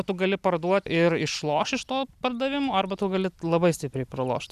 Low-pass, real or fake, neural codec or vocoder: 14.4 kHz; real; none